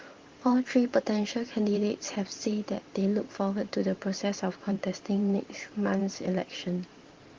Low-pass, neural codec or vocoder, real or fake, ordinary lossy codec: 7.2 kHz; vocoder, 44.1 kHz, 128 mel bands every 512 samples, BigVGAN v2; fake; Opus, 16 kbps